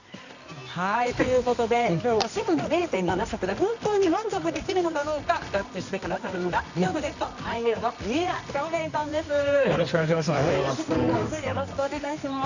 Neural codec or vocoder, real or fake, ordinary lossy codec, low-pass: codec, 24 kHz, 0.9 kbps, WavTokenizer, medium music audio release; fake; none; 7.2 kHz